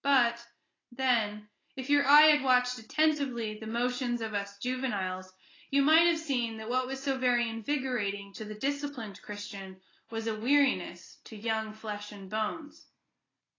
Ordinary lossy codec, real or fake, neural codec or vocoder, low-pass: AAC, 32 kbps; real; none; 7.2 kHz